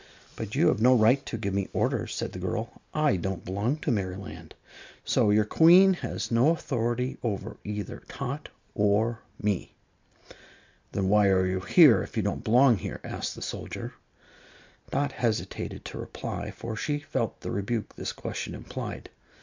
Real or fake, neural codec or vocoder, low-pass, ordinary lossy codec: real; none; 7.2 kHz; MP3, 64 kbps